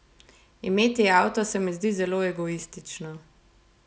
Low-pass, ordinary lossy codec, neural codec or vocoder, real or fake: none; none; none; real